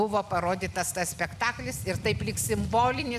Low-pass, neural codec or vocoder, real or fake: 14.4 kHz; none; real